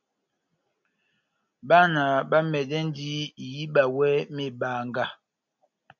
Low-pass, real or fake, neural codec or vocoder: 7.2 kHz; real; none